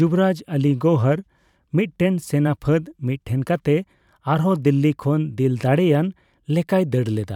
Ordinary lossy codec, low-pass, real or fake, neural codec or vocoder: none; 19.8 kHz; fake; vocoder, 44.1 kHz, 128 mel bands every 512 samples, BigVGAN v2